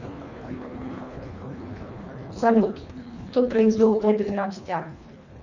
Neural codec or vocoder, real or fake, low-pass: codec, 24 kHz, 1.5 kbps, HILCodec; fake; 7.2 kHz